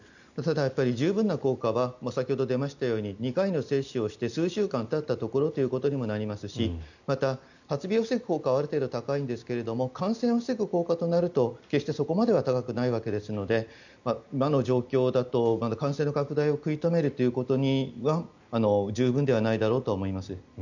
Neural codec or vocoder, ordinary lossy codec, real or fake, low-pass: none; none; real; 7.2 kHz